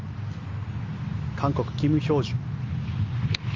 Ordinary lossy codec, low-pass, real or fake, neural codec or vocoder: Opus, 32 kbps; 7.2 kHz; real; none